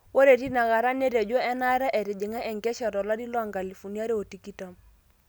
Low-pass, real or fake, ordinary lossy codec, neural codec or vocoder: none; real; none; none